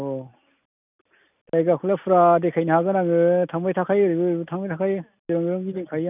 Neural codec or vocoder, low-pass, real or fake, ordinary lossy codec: none; 3.6 kHz; real; none